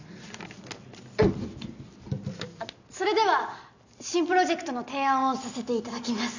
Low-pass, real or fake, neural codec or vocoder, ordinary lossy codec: 7.2 kHz; real; none; none